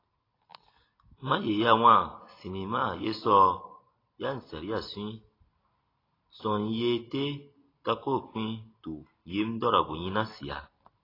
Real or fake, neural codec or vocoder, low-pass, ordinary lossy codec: real; none; 5.4 kHz; AAC, 24 kbps